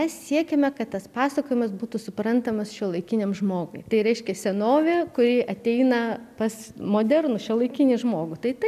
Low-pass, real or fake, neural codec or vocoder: 14.4 kHz; real; none